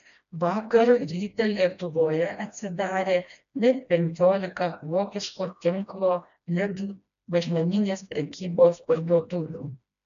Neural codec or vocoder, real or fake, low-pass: codec, 16 kHz, 1 kbps, FreqCodec, smaller model; fake; 7.2 kHz